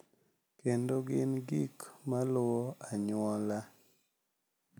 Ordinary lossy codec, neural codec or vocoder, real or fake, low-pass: none; none; real; none